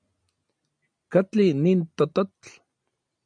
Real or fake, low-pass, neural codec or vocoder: real; 9.9 kHz; none